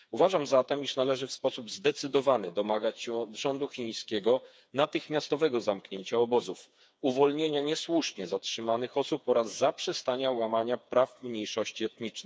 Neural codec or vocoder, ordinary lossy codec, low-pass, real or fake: codec, 16 kHz, 4 kbps, FreqCodec, smaller model; none; none; fake